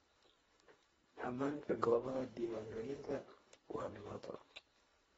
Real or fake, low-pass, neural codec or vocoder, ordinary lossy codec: fake; 10.8 kHz; codec, 24 kHz, 1.5 kbps, HILCodec; AAC, 24 kbps